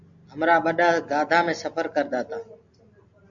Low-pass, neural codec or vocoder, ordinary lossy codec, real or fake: 7.2 kHz; none; MP3, 64 kbps; real